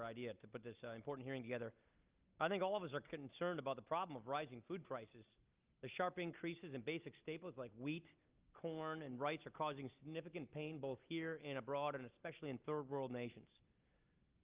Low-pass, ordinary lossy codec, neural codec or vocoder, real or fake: 3.6 kHz; Opus, 32 kbps; none; real